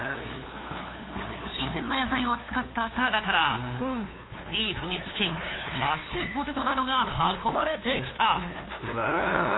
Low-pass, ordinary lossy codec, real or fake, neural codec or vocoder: 7.2 kHz; AAC, 16 kbps; fake; codec, 16 kHz, 2 kbps, FunCodec, trained on LibriTTS, 25 frames a second